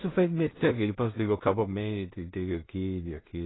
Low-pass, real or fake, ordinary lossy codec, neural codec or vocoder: 7.2 kHz; fake; AAC, 16 kbps; codec, 16 kHz in and 24 kHz out, 0.4 kbps, LongCat-Audio-Codec, two codebook decoder